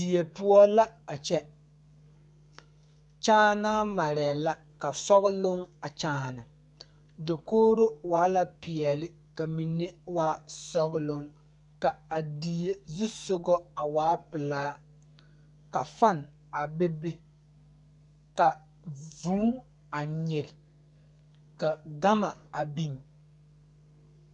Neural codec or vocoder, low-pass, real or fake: codec, 32 kHz, 1.9 kbps, SNAC; 10.8 kHz; fake